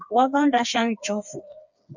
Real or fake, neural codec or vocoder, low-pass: fake; codec, 16 kHz, 4 kbps, FreqCodec, smaller model; 7.2 kHz